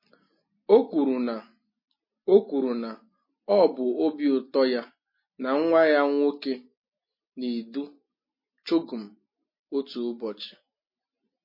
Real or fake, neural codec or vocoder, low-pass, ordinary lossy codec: real; none; 5.4 kHz; MP3, 24 kbps